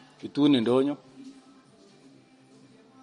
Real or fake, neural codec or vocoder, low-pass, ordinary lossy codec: real; none; 19.8 kHz; MP3, 48 kbps